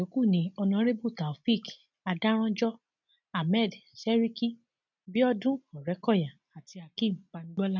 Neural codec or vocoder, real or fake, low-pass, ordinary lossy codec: none; real; 7.2 kHz; none